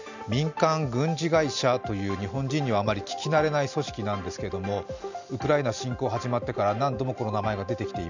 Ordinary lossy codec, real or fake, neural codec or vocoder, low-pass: none; real; none; 7.2 kHz